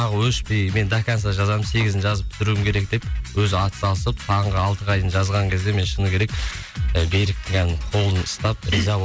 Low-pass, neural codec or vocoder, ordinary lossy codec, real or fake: none; none; none; real